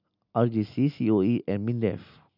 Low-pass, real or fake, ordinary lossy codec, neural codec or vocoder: 5.4 kHz; real; none; none